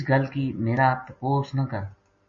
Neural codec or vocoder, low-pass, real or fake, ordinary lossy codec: none; 7.2 kHz; real; MP3, 32 kbps